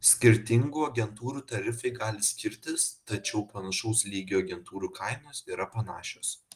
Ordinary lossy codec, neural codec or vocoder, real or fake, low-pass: Opus, 24 kbps; none; real; 14.4 kHz